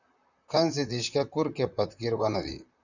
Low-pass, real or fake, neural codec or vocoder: 7.2 kHz; fake; vocoder, 44.1 kHz, 128 mel bands, Pupu-Vocoder